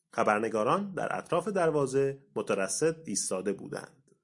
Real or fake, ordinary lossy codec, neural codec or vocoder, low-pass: real; MP3, 64 kbps; none; 10.8 kHz